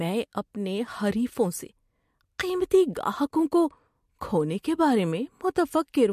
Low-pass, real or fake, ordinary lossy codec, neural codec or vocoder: 14.4 kHz; real; MP3, 64 kbps; none